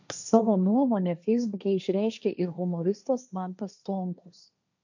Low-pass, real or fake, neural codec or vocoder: 7.2 kHz; fake; codec, 16 kHz, 1.1 kbps, Voila-Tokenizer